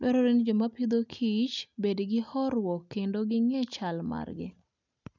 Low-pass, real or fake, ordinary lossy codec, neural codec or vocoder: 7.2 kHz; real; none; none